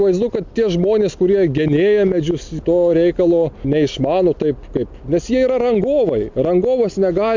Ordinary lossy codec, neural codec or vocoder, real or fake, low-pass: MP3, 64 kbps; none; real; 7.2 kHz